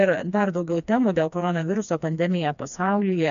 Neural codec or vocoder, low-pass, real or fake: codec, 16 kHz, 2 kbps, FreqCodec, smaller model; 7.2 kHz; fake